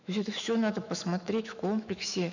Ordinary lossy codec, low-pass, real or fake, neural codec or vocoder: none; 7.2 kHz; fake; autoencoder, 48 kHz, 128 numbers a frame, DAC-VAE, trained on Japanese speech